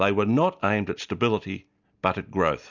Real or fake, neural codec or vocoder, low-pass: real; none; 7.2 kHz